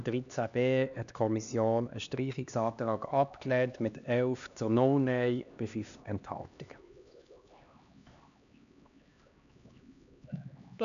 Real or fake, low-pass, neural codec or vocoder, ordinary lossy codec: fake; 7.2 kHz; codec, 16 kHz, 2 kbps, X-Codec, HuBERT features, trained on LibriSpeech; none